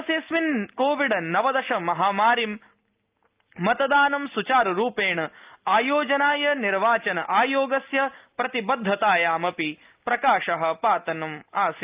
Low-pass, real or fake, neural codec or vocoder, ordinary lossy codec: 3.6 kHz; real; none; Opus, 24 kbps